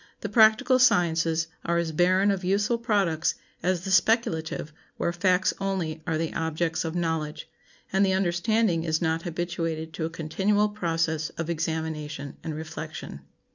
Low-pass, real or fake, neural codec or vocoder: 7.2 kHz; real; none